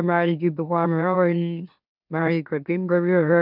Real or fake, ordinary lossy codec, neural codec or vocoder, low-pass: fake; none; autoencoder, 44.1 kHz, a latent of 192 numbers a frame, MeloTTS; 5.4 kHz